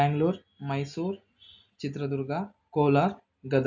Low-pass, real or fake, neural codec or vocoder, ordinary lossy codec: 7.2 kHz; real; none; none